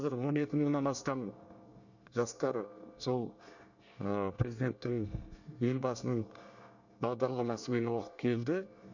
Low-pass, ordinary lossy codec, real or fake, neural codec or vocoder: 7.2 kHz; none; fake; codec, 24 kHz, 1 kbps, SNAC